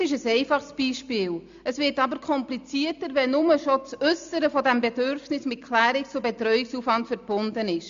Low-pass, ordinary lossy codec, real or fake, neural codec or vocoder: 7.2 kHz; AAC, 64 kbps; real; none